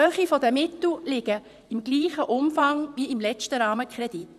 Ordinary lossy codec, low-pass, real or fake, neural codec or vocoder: none; 14.4 kHz; fake; vocoder, 44.1 kHz, 128 mel bands, Pupu-Vocoder